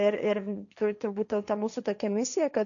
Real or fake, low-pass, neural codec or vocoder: fake; 7.2 kHz; codec, 16 kHz, 1.1 kbps, Voila-Tokenizer